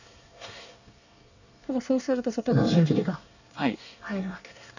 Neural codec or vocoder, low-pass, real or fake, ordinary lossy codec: codec, 24 kHz, 1 kbps, SNAC; 7.2 kHz; fake; none